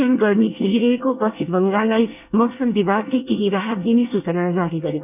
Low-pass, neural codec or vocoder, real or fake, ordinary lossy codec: 3.6 kHz; codec, 24 kHz, 1 kbps, SNAC; fake; none